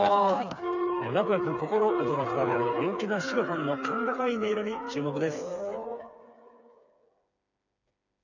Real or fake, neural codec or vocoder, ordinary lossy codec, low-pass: fake; codec, 16 kHz, 4 kbps, FreqCodec, smaller model; none; 7.2 kHz